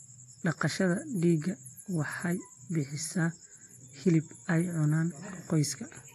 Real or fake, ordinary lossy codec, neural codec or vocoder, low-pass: real; AAC, 48 kbps; none; 14.4 kHz